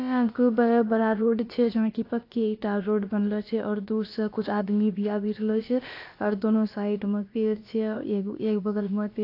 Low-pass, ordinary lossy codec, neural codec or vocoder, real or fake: 5.4 kHz; AAC, 32 kbps; codec, 16 kHz, about 1 kbps, DyCAST, with the encoder's durations; fake